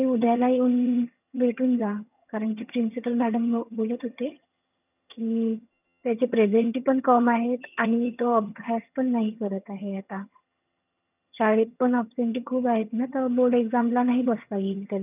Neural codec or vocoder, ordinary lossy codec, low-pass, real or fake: vocoder, 22.05 kHz, 80 mel bands, HiFi-GAN; none; 3.6 kHz; fake